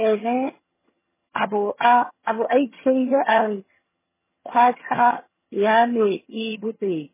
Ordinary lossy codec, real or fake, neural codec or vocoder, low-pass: MP3, 16 kbps; fake; vocoder, 22.05 kHz, 80 mel bands, HiFi-GAN; 3.6 kHz